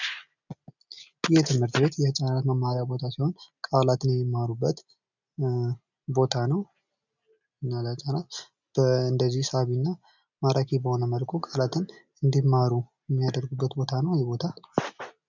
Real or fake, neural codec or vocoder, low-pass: real; none; 7.2 kHz